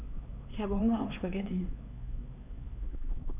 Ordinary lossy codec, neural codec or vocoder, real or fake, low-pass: none; codec, 16 kHz, 4 kbps, X-Codec, HuBERT features, trained on LibriSpeech; fake; 3.6 kHz